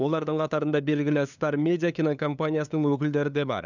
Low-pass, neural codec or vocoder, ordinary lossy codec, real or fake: 7.2 kHz; codec, 16 kHz, 2 kbps, FunCodec, trained on LibriTTS, 25 frames a second; none; fake